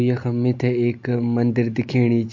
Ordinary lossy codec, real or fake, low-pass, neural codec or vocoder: MP3, 48 kbps; real; 7.2 kHz; none